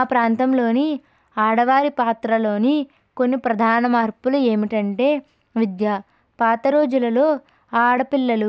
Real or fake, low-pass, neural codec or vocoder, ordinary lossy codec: real; none; none; none